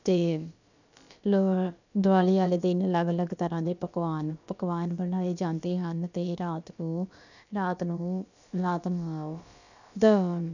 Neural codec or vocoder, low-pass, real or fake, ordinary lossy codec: codec, 16 kHz, about 1 kbps, DyCAST, with the encoder's durations; 7.2 kHz; fake; none